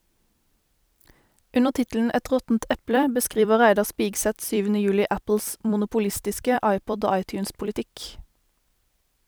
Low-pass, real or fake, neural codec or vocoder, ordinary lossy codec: none; fake; vocoder, 44.1 kHz, 128 mel bands every 256 samples, BigVGAN v2; none